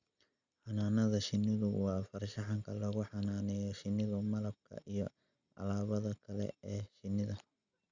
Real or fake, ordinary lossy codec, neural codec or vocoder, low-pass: real; Opus, 64 kbps; none; 7.2 kHz